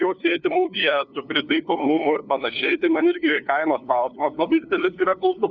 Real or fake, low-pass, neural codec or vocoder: fake; 7.2 kHz; codec, 16 kHz, 2 kbps, FunCodec, trained on LibriTTS, 25 frames a second